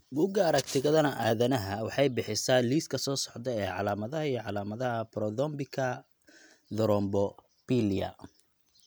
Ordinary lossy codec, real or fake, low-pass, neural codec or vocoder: none; real; none; none